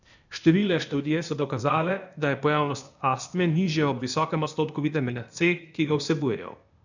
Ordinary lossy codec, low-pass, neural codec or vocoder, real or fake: none; 7.2 kHz; codec, 16 kHz, 0.8 kbps, ZipCodec; fake